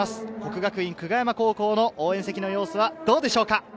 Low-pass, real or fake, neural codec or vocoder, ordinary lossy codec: none; real; none; none